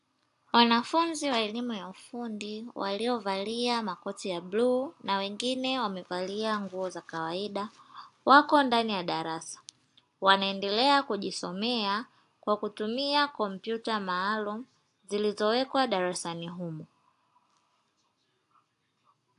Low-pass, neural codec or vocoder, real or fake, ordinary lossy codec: 10.8 kHz; none; real; AAC, 64 kbps